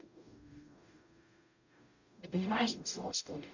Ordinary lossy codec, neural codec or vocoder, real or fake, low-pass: none; codec, 44.1 kHz, 0.9 kbps, DAC; fake; 7.2 kHz